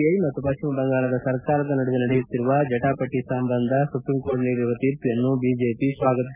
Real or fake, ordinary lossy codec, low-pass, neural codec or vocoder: real; none; 3.6 kHz; none